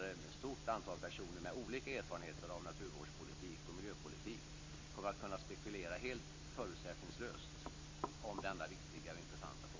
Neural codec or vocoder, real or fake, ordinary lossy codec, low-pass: none; real; MP3, 32 kbps; 7.2 kHz